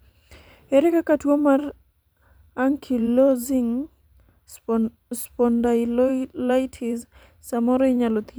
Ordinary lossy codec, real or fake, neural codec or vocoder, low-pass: none; fake; vocoder, 44.1 kHz, 128 mel bands every 512 samples, BigVGAN v2; none